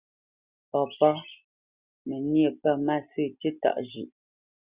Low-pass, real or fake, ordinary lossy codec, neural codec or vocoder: 3.6 kHz; real; Opus, 64 kbps; none